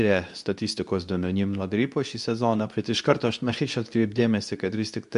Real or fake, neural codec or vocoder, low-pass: fake; codec, 24 kHz, 0.9 kbps, WavTokenizer, medium speech release version 2; 10.8 kHz